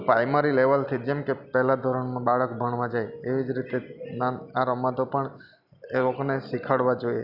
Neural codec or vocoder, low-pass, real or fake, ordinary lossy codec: none; 5.4 kHz; real; none